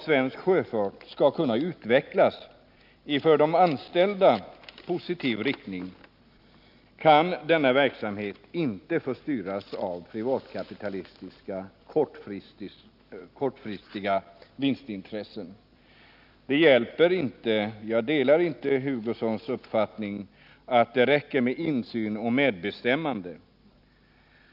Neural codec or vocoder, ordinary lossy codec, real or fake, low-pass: none; none; real; 5.4 kHz